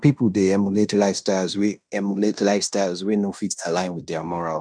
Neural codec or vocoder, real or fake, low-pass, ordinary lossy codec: codec, 16 kHz in and 24 kHz out, 0.9 kbps, LongCat-Audio-Codec, fine tuned four codebook decoder; fake; 9.9 kHz; none